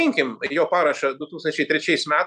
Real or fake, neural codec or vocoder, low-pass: real; none; 9.9 kHz